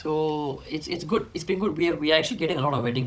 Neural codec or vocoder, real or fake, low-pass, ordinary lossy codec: codec, 16 kHz, 16 kbps, FunCodec, trained on Chinese and English, 50 frames a second; fake; none; none